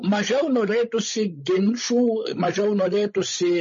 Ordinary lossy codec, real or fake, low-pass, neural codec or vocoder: MP3, 32 kbps; fake; 7.2 kHz; codec, 16 kHz, 16 kbps, FreqCodec, larger model